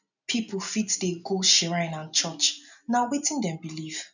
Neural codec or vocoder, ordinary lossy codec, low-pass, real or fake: none; none; 7.2 kHz; real